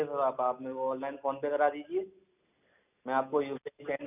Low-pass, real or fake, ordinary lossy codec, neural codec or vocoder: 3.6 kHz; real; none; none